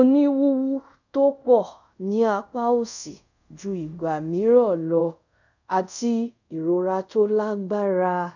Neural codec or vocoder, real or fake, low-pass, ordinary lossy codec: codec, 24 kHz, 0.5 kbps, DualCodec; fake; 7.2 kHz; none